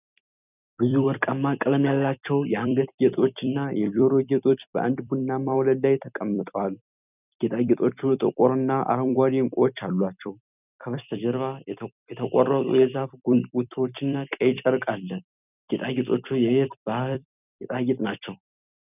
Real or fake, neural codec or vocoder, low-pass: fake; vocoder, 44.1 kHz, 128 mel bands every 256 samples, BigVGAN v2; 3.6 kHz